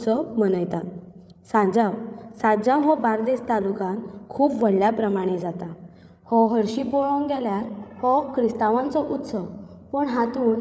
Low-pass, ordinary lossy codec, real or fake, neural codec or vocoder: none; none; fake; codec, 16 kHz, 8 kbps, FreqCodec, larger model